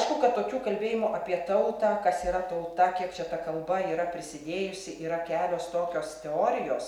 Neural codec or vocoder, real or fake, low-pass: none; real; 19.8 kHz